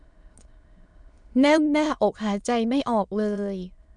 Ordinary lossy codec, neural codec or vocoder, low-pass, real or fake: none; autoencoder, 22.05 kHz, a latent of 192 numbers a frame, VITS, trained on many speakers; 9.9 kHz; fake